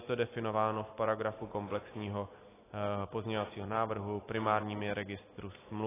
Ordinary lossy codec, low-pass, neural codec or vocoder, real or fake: AAC, 16 kbps; 3.6 kHz; none; real